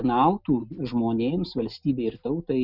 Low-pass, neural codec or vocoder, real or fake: 5.4 kHz; none; real